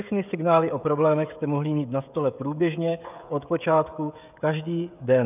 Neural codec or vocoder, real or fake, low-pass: codec, 16 kHz, 16 kbps, FreqCodec, smaller model; fake; 3.6 kHz